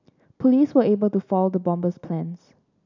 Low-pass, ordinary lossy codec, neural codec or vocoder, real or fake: 7.2 kHz; none; none; real